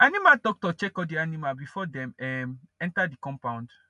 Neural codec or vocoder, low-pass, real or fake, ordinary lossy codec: none; 10.8 kHz; real; none